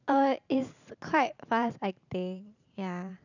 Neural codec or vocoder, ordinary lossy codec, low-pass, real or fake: vocoder, 44.1 kHz, 128 mel bands every 512 samples, BigVGAN v2; none; 7.2 kHz; fake